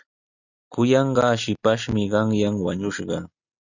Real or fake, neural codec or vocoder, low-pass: real; none; 7.2 kHz